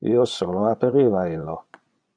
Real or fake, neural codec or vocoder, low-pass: fake; vocoder, 24 kHz, 100 mel bands, Vocos; 9.9 kHz